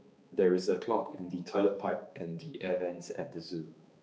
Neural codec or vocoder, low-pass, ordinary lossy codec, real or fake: codec, 16 kHz, 2 kbps, X-Codec, HuBERT features, trained on balanced general audio; none; none; fake